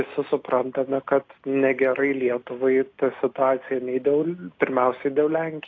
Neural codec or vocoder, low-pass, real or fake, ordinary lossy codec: none; 7.2 kHz; real; AAC, 32 kbps